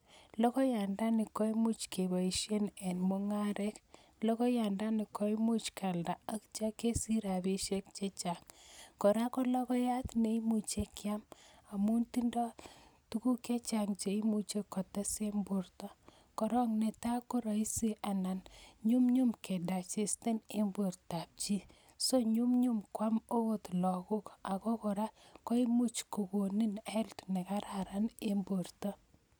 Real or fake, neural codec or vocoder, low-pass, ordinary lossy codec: real; none; none; none